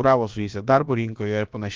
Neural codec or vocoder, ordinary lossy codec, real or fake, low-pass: codec, 16 kHz, about 1 kbps, DyCAST, with the encoder's durations; Opus, 32 kbps; fake; 7.2 kHz